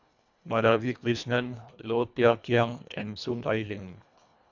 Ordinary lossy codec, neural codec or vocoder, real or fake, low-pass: none; codec, 24 kHz, 1.5 kbps, HILCodec; fake; 7.2 kHz